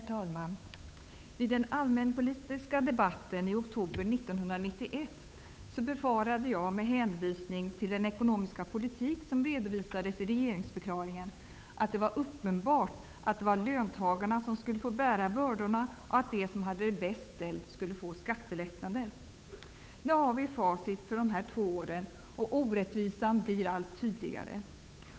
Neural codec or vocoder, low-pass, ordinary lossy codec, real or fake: codec, 16 kHz, 8 kbps, FunCodec, trained on Chinese and English, 25 frames a second; none; none; fake